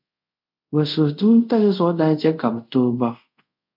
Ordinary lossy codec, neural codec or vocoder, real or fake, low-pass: MP3, 48 kbps; codec, 24 kHz, 0.5 kbps, DualCodec; fake; 5.4 kHz